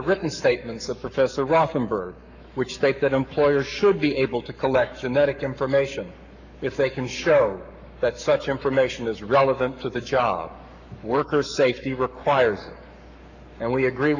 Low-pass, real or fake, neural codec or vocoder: 7.2 kHz; fake; codec, 44.1 kHz, 7.8 kbps, Pupu-Codec